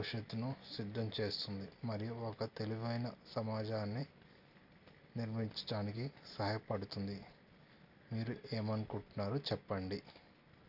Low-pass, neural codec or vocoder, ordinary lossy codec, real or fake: 5.4 kHz; none; none; real